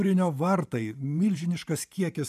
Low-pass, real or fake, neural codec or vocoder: 14.4 kHz; fake; vocoder, 44.1 kHz, 128 mel bands every 512 samples, BigVGAN v2